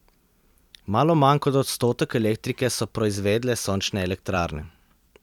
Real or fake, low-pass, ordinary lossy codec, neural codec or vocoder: fake; 19.8 kHz; none; vocoder, 44.1 kHz, 128 mel bands every 256 samples, BigVGAN v2